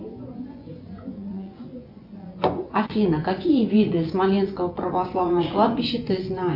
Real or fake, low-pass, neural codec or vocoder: fake; 5.4 kHz; vocoder, 24 kHz, 100 mel bands, Vocos